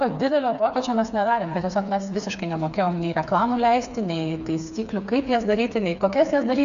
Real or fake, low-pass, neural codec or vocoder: fake; 7.2 kHz; codec, 16 kHz, 4 kbps, FreqCodec, smaller model